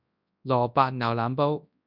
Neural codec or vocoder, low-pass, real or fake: codec, 24 kHz, 0.9 kbps, WavTokenizer, large speech release; 5.4 kHz; fake